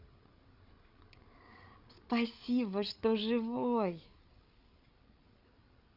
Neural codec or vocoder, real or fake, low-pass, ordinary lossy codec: codec, 16 kHz, 8 kbps, FreqCodec, larger model; fake; 5.4 kHz; Opus, 64 kbps